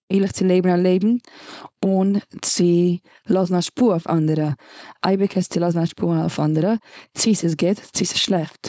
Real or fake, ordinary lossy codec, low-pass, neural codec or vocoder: fake; none; none; codec, 16 kHz, 4.8 kbps, FACodec